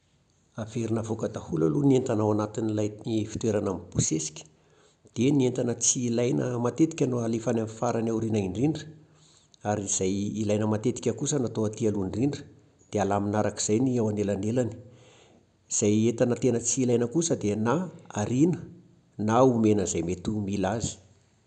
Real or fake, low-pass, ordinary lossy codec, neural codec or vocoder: real; 9.9 kHz; none; none